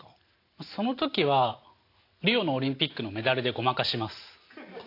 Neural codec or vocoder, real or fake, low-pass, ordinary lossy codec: none; real; 5.4 kHz; AAC, 32 kbps